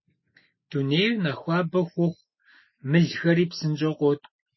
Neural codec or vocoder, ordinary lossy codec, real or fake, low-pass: none; MP3, 24 kbps; real; 7.2 kHz